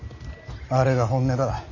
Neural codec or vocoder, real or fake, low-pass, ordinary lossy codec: none; real; 7.2 kHz; none